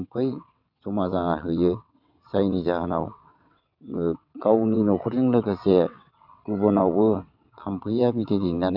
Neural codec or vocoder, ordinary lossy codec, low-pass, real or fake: vocoder, 22.05 kHz, 80 mel bands, WaveNeXt; none; 5.4 kHz; fake